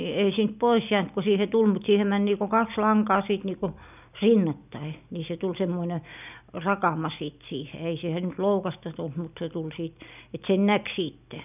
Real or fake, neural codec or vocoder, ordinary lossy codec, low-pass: real; none; none; 3.6 kHz